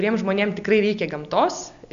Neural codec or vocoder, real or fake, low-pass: none; real; 7.2 kHz